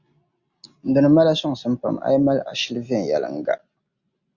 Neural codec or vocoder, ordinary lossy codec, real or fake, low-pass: none; Opus, 64 kbps; real; 7.2 kHz